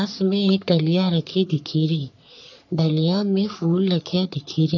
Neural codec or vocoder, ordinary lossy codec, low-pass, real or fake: codec, 44.1 kHz, 3.4 kbps, Pupu-Codec; none; 7.2 kHz; fake